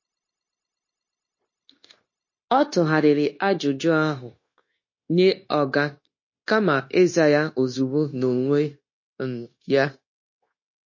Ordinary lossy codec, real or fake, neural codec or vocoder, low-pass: MP3, 32 kbps; fake; codec, 16 kHz, 0.9 kbps, LongCat-Audio-Codec; 7.2 kHz